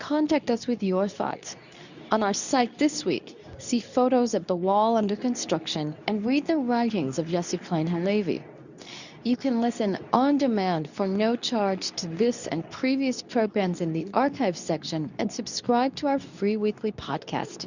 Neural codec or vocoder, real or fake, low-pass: codec, 24 kHz, 0.9 kbps, WavTokenizer, medium speech release version 2; fake; 7.2 kHz